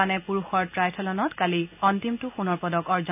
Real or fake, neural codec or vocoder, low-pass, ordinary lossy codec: real; none; 3.6 kHz; none